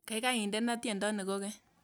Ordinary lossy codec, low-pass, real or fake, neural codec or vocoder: none; none; real; none